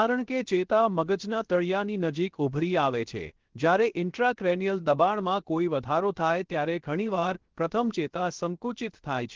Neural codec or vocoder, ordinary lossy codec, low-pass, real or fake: codec, 16 kHz, 0.7 kbps, FocalCodec; Opus, 16 kbps; 7.2 kHz; fake